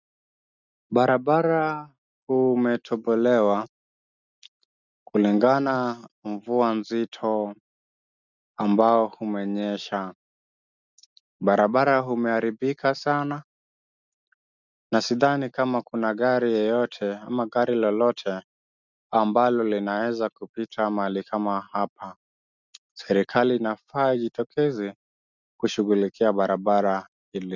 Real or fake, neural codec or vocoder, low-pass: real; none; 7.2 kHz